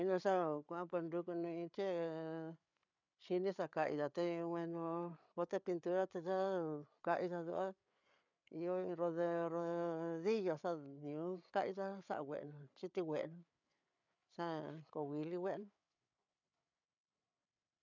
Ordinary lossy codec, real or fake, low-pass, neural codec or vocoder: none; fake; 7.2 kHz; codec, 16 kHz, 8 kbps, FreqCodec, larger model